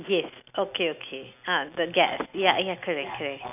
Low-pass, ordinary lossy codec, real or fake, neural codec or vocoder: 3.6 kHz; none; real; none